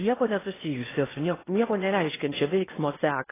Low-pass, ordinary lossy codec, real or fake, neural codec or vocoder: 3.6 kHz; AAC, 16 kbps; fake; codec, 16 kHz in and 24 kHz out, 0.8 kbps, FocalCodec, streaming, 65536 codes